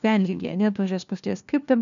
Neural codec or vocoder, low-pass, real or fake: codec, 16 kHz, 1 kbps, FunCodec, trained on LibriTTS, 50 frames a second; 7.2 kHz; fake